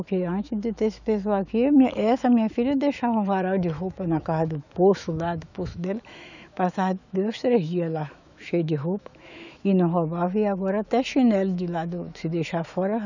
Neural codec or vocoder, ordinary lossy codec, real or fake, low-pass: autoencoder, 48 kHz, 128 numbers a frame, DAC-VAE, trained on Japanese speech; none; fake; 7.2 kHz